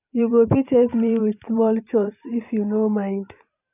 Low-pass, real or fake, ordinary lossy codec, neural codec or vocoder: 3.6 kHz; fake; none; vocoder, 44.1 kHz, 128 mel bands every 512 samples, BigVGAN v2